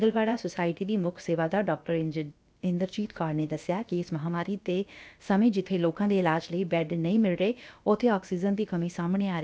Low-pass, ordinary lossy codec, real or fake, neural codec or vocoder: none; none; fake; codec, 16 kHz, about 1 kbps, DyCAST, with the encoder's durations